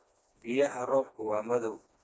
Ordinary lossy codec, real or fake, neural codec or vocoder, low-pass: none; fake; codec, 16 kHz, 2 kbps, FreqCodec, smaller model; none